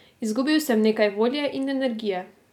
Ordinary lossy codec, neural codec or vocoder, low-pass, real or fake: none; none; 19.8 kHz; real